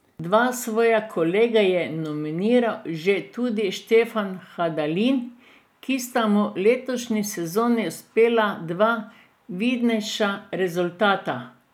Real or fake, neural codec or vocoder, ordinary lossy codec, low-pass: real; none; none; 19.8 kHz